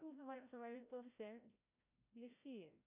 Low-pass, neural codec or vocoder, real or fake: 3.6 kHz; codec, 16 kHz, 0.5 kbps, FreqCodec, larger model; fake